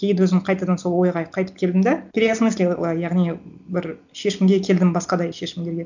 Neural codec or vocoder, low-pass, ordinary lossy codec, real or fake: none; 7.2 kHz; none; real